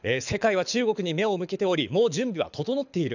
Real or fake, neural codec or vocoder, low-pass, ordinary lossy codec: fake; codec, 24 kHz, 6 kbps, HILCodec; 7.2 kHz; none